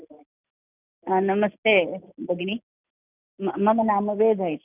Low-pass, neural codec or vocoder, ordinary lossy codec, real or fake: 3.6 kHz; none; none; real